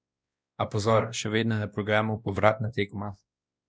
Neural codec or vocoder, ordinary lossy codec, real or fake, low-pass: codec, 16 kHz, 1 kbps, X-Codec, WavLM features, trained on Multilingual LibriSpeech; none; fake; none